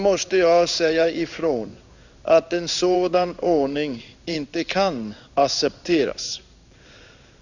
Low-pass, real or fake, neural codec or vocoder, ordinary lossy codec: 7.2 kHz; fake; codec, 16 kHz in and 24 kHz out, 1 kbps, XY-Tokenizer; none